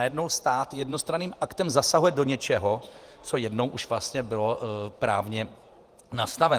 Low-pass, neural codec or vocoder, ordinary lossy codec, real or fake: 14.4 kHz; vocoder, 48 kHz, 128 mel bands, Vocos; Opus, 32 kbps; fake